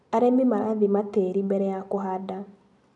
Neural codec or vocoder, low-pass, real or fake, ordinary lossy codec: none; 10.8 kHz; real; none